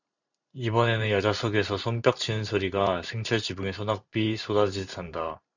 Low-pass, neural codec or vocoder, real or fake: 7.2 kHz; vocoder, 44.1 kHz, 128 mel bands every 512 samples, BigVGAN v2; fake